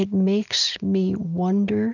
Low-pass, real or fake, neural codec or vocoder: 7.2 kHz; real; none